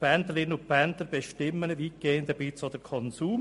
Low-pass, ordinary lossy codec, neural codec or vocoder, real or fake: 14.4 kHz; MP3, 48 kbps; none; real